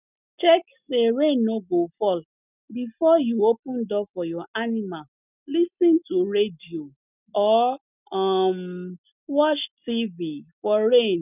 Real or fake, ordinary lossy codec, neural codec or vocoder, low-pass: real; none; none; 3.6 kHz